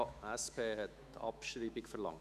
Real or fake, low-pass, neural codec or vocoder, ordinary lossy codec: fake; 14.4 kHz; autoencoder, 48 kHz, 128 numbers a frame, DAC-VAE, trained on Japanese speech; none